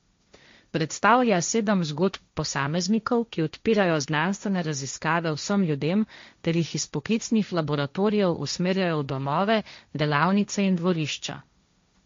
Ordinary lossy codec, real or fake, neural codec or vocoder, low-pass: MP3, 48 kbps; fake; codec, 16 kHz, 1.1 kbps, Voila-Tokenizer; 7.2 kHz